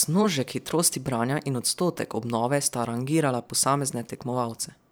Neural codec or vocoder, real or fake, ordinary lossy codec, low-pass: vocoder, 44.1 kHz, 128 mel bands every 512 samples, BigVGAN v2; fake; none; none